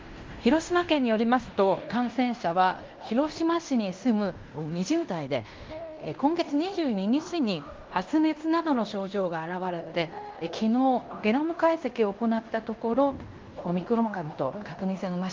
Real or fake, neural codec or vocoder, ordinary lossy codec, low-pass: fake; codec, 16 kHz in and 24 kHz out, 0.9 kbps, LongCat-Audio-Codec, fine tuned four codebook decoder; Opus, 32 kbps; 7.2 kHz